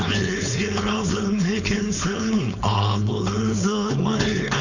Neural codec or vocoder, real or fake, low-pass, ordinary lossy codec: codec, 16 kHz, 4.8 kbps, FACodec; fake; 7.2 kHz; none